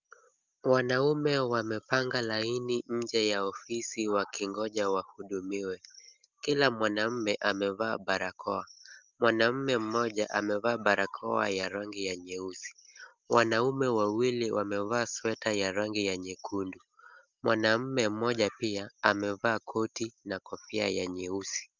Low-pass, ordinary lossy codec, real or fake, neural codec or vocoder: 7.2 kHz; Opus, 32 kbps; real; none